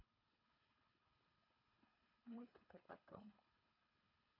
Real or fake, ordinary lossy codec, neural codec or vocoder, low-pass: fake; none; codec, 24 kHz, 3 kbps, HILCodec; 5.4 kHz